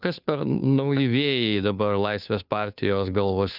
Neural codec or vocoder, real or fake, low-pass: codec, 16 kHz, 2 kbps, FunCodec, trained on Chinese and English, 25 frames a second; fake; 5.4 kHz